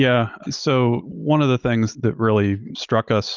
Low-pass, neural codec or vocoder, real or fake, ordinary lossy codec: 7.2 kHz; none; real; Opus, 24 kbps